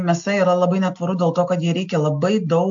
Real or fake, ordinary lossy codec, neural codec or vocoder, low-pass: real; AAC, 64 kbps; none; 7.2 kHz